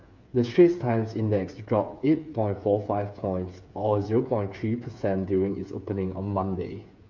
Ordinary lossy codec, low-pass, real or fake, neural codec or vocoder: none; 7.2 kHz; fake; codec, 16 kHz, 8 kbps, FreqCodec, smaller model